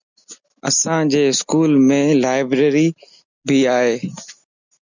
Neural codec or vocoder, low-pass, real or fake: none; 7.2 kHz; real